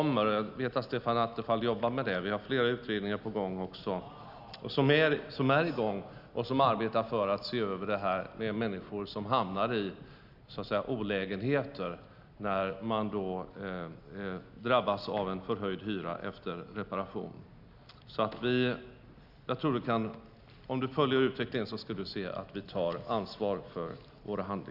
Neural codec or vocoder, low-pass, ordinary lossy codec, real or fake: none; 5.4 kHz; none; real